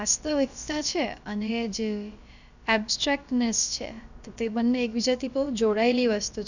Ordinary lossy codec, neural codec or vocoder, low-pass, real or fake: none; codec, 16 kHz, about 1 kbps, DyCAST, with the encoder's durations; 7.2 kHz; fake